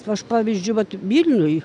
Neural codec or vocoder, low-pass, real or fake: none; 10.8 kHz; real